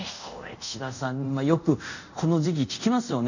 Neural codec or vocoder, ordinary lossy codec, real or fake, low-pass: codec, 24 kHz, 0.5 kbps, DualCodec; none; fake; 7.2 kHz